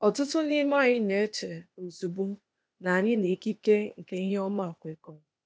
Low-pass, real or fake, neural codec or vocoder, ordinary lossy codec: none; fake; codec, 16 kHz, 0.8 kbps, ZipCodec; none